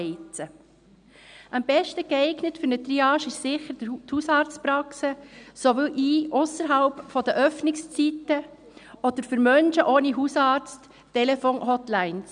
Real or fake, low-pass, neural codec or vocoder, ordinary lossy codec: real; 9.9 kHz; none; none